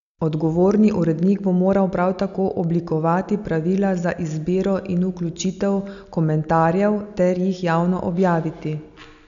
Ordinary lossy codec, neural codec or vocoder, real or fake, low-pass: none; none; real; 7.2 kHz